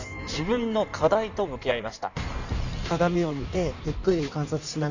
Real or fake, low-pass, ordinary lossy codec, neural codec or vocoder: fake; 7.2 kHz; none; codec, 16 kHz in and 24 kHz out, 1.1 kbps, FireRedTTS-2 codec